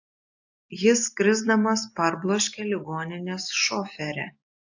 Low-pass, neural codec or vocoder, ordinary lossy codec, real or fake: 7.2 kHz; none; AAC, 48 kbps; real